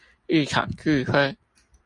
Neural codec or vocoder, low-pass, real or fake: none; 10.8 kHz; real